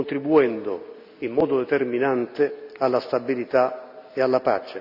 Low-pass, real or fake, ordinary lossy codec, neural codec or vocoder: 5.4 kHz; real; none; none